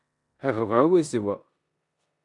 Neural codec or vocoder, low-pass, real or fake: codec, 16 kHz in and 24 kHz out, 0.9 kbps, LongCat-Audio-Codec, four codebook decoder; 10.8 kHz; fake